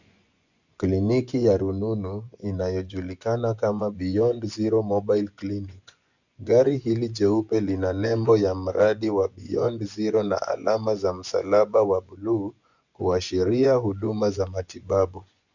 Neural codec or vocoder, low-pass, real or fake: vocoder, 24 kHz, 100 mel bands, Vocos; 7.2 kHz; fake